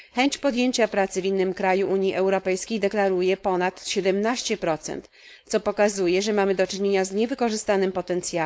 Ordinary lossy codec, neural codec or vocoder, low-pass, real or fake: none; codec, 16 kHz, 4.8 kbps, FACodec; none; fake